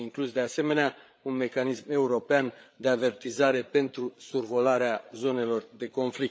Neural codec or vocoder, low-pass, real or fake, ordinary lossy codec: codec, 16 kHz, 8 kbps, FreqCodec, larger model; none; fake; none